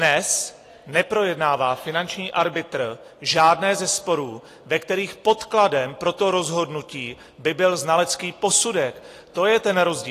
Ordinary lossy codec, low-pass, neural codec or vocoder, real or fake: AAC, 48 kbps; 14.4 kHz; none; real